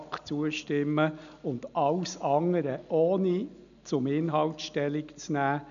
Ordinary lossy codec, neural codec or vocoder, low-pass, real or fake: none; none; 7.2 kHz; real